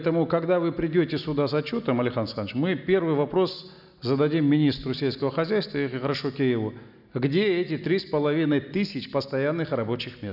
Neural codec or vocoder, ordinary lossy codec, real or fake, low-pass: none; none; real; 5.4 kHz